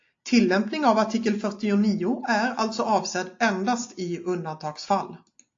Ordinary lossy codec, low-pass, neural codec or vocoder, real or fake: AAC, 48 kbps; 7.2 kHz; none; real